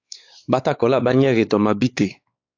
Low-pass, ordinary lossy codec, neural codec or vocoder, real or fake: 7.2 kHz; AAC, 48 kbps; codec, 16 kHz, 4 kbps, X-Codec, WavLM features, trained on Multilingual LibriSpeech; fake